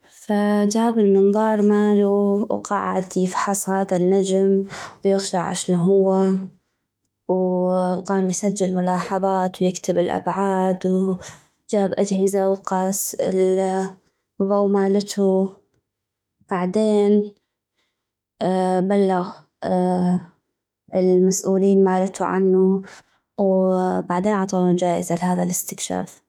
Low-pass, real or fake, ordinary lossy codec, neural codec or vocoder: 19.8 kHz; fake; none; autoencoder, 48 kHz, 32 numbers a frame, DAC-VAE, trained on Japanese speech